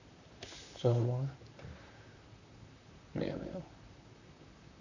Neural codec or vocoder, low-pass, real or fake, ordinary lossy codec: vocoder, 44.1 kHz, 128 mel bands, Pupu-Vocoder; 7.2 kHz; fake; none